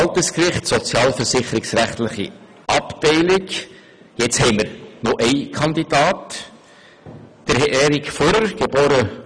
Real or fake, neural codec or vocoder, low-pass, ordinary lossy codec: real; none; 9.9 kHz; none